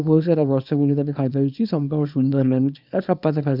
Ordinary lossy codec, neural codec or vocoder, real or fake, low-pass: none; codec, 24 kHz, 0.9 kbps, WavTokenizer, small release; fake; 5.4 kHz